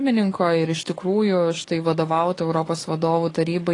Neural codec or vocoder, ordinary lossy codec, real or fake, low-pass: codec, 44.1 kHz, 7.8 kbps, DAC; AAC, 32 kbps; fake; 10.8 kHz